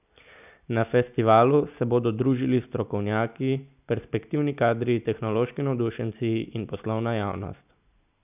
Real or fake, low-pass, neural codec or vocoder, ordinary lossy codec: real; 3.6 kHz; none; none